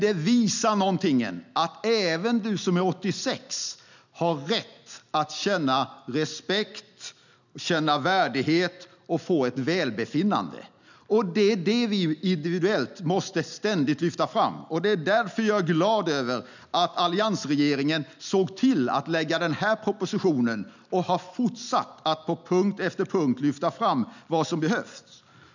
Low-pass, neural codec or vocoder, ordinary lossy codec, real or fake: 7.2 kHz; none; none; real